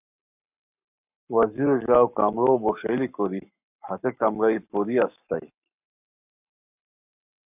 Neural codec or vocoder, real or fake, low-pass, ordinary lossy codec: codec, 44.1 kHz, 7.8 kbps, Pupu-Codec; fake; 3.6 kHz; AAC, 32 kbps